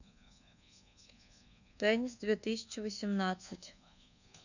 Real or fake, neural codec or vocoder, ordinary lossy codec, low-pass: fake; codec, 24 kHz, 1.2 kbps, DualCodec; none; 7.2 kHz